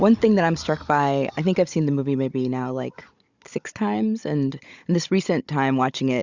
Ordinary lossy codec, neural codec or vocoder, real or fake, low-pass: Opus, 64 kbps; codec, 16 kHz, 16 kbps, FunCodec, trained on Chinese and English, 50 frames a second; fake; 7.2 kHz